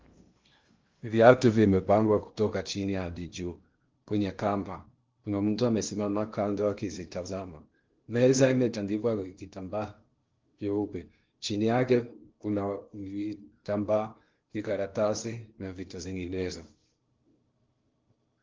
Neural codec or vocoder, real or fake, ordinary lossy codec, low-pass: codec, 16 kHz in and 24 kHz out, 0.8 kbps, FocalCodec, streaming, 65536 codes; fake; Opus, 32 kbps; 7.2 kHz